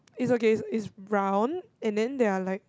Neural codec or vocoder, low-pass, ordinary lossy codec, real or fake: none; none; none; real